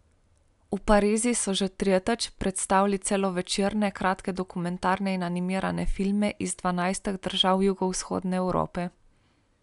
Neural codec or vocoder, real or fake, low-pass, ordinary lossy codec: none; real; 10.8 kHz; none